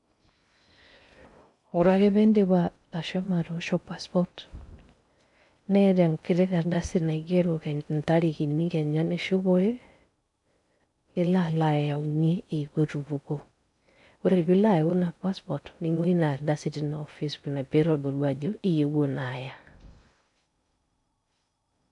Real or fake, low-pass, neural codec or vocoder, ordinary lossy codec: fake; 10.8 kHz; codec, 16 kHz in and 24 kHz out, 0.6 kbps, FocalCodec, streaming, 2048 codes; none